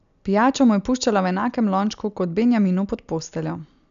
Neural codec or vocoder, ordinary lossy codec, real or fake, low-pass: none; none; real; 7.2 kHz